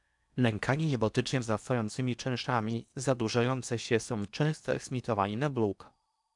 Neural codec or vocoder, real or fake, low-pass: codec, 16 kHz in and 24 kHz out, 0.8 kbps, FocalCodec, streaming, 65536 codes; fake; 10.8 kHz